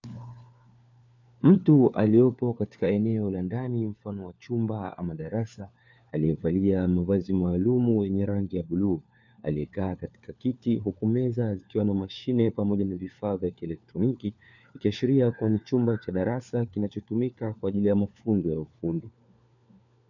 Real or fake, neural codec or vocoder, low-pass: fake; codec, 16 kHz, 4 kbps, FunCodec, trained on LibriTTS, 50 frames a second; 7.2 kHz